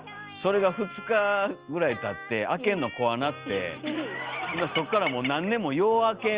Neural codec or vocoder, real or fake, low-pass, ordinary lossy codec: none; real; 3.6 kHz; Opus, 32 kbps